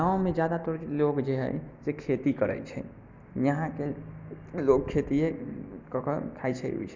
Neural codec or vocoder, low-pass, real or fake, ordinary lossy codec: none; 7.2 kHz; real; MP3, 64 kbps